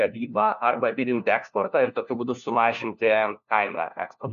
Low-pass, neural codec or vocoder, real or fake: 7.2 kHz; codec, 16 kHz, 1 kbps, FunCodec, trained on LibriTTS, 50 frames a second; fake